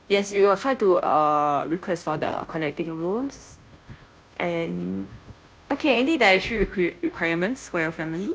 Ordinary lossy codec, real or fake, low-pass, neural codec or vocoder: none; fake; none; codec, 16 kHz, 0.5 kbps, FunCodec, trained on Chinese and English, 25 frames a second